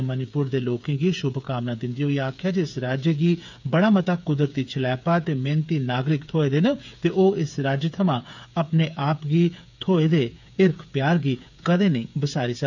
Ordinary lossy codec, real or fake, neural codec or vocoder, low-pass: none; fake; codec, 16 kHz, 8 kbps, FreqCodec, smaller model; 7.2 kHz